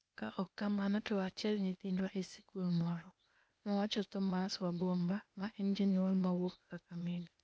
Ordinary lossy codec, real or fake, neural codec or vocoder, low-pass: none; fake; codec, 16 kHz, 0.8 kbps, ZipCodec; none